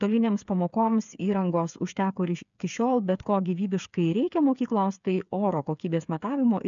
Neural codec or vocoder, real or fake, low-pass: codec, 16 kHz, 8 kbps, FreqCodec, smaller model; fake; 7.2 kHz